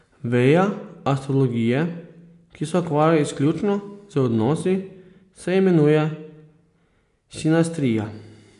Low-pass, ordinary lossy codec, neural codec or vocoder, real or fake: 10.8 kHz; MP3, 64 kbps; none; real